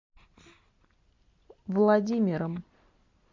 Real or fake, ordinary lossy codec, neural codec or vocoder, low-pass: real; MP3, 48 kbps; none; 7.2 kHz